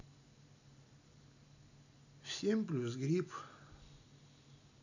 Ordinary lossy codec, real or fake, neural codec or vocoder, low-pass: none; real; none; 7.2 kHz